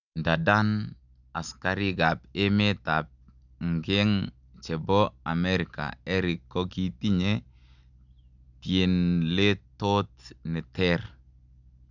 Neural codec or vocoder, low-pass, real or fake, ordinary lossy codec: none; 7.2 kHz; real; none